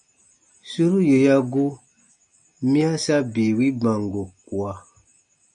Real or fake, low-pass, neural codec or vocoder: real; 9.9 kHz; none